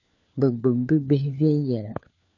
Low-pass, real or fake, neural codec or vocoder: 7.2 kHz; fake; codec, 16 kHz, 4 kbps, FunCodec, trained on LibriTTS, 50 frames a second